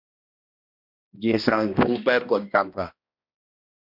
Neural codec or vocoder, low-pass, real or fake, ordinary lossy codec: codec, 16 kHz, 1 kbps, X-Codec, HuBERT features, trained on balanced general audio; 5.4 kHz; fake; MP3, 48 kbps